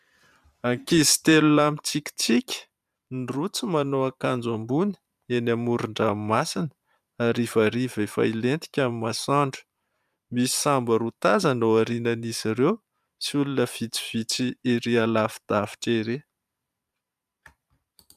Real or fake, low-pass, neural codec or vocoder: fake; 14.4 kHz; vocoder, 44.1 kHz, 128 mel bands every 512 samples, BigVGAN v2